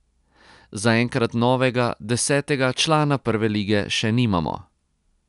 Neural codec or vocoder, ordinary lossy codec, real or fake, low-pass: none; none; real; 10.8 kHz